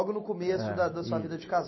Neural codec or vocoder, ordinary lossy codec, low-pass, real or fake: none; MP3, 24 kbps; 7.2 kHz; real